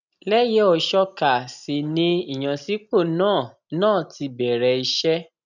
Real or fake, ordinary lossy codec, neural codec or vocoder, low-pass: real; none; none; 7.2 kHz